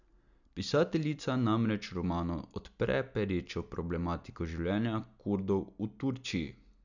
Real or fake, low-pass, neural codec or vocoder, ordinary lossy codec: real; 7.2 kHz; none; none